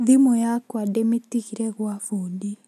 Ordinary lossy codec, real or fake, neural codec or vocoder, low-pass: none; real; none; 14.4 kHz